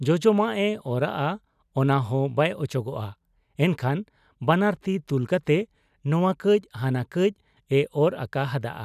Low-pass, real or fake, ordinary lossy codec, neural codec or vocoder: 14.4 kHz; real; none; none